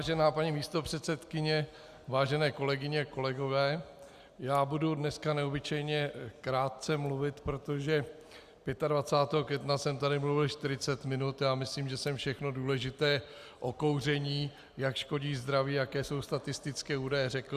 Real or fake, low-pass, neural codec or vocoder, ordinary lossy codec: real; 14.4 kHz; none; Opus, 64 kbps